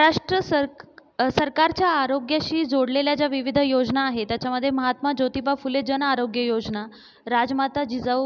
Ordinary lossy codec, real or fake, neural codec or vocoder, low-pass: none; real; none; none